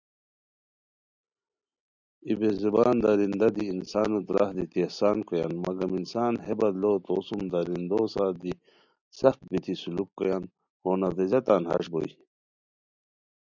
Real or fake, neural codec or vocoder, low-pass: real; none; 7.2 kHz